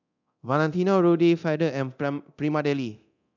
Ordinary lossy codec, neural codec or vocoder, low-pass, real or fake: none; codec, 24 kHz, 0.9 kbps, DualCodec; 7.2 kHz; fake